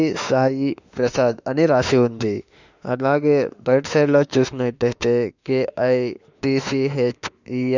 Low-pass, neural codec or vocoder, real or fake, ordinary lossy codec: 7.2 kHz; autoencoder, 48 kHz, 32 numbers a frame, DAC-VAE, trained on Japanese speech; fake; none